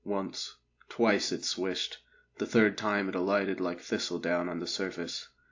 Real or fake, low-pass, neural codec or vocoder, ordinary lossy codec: real; 7.2 kHz; none; AAC, 48 kbps